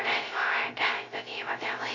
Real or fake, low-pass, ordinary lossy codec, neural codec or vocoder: fake; 7.2 kHz; AAC, 32 kbps; codec, 16 kHz, 0.3 kbps, FocalCodec